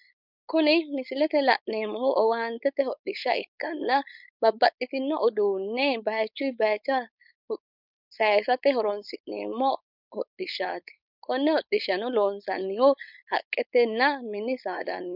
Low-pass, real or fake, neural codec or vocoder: 5.4 kHz; fake; codec, 16 kHz, 4.8 kbps, FACodec